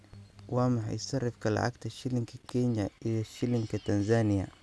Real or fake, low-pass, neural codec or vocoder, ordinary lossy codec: real; none; none; none